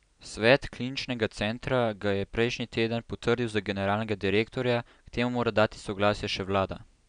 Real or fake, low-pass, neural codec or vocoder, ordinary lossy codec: real; 9.9 kHz; none; none